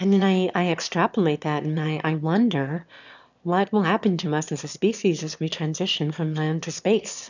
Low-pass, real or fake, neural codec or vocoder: 7.2 kHz; fake; autoencoder, 22.05 kHz, a latent of 192 numbers a frame, VITS, trained on one speaker